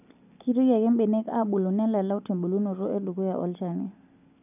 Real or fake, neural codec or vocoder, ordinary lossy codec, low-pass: real; none; none; 3.6 kHz